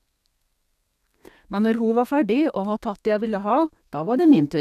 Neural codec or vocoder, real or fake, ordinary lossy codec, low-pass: codec, 32 kHz, 1.9 kbps, SNAC; fake; none; 14.4 kHz